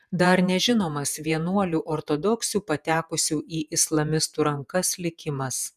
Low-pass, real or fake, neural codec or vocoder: 14.4 kHz; fake; vocoder, 48 kHz, 128 mel bands, Vocos